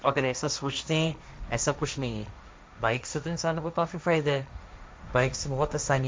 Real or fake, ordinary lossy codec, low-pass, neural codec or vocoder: fake; none; none; codec, 16 kHz, 1.1 kbps, Voila-Tokenizer